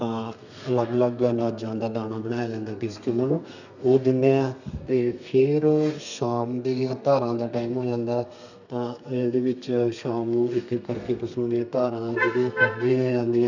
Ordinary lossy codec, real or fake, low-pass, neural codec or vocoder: none; fake; 7.2 kHz; codec, 32 kHz, 1.9 kbps, SNAC